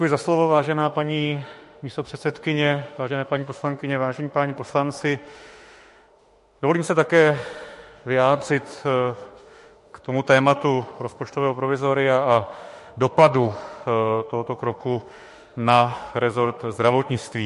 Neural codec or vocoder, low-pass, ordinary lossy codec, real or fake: autoencoder, 48 kHz, 32 numbers a frame, DAC-VAE, trained on Japanese speech; 14.4 kHz; MP3, 48 kbps; fake